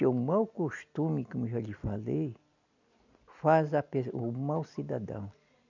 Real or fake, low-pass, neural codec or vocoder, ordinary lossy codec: real; 7.2 kHz; none; none